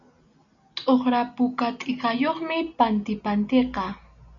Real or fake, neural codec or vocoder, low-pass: real; none; 7.2 kHz